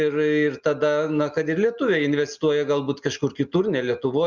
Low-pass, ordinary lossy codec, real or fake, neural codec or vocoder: 7.2 kHz; Opus, 64 kbps; real; none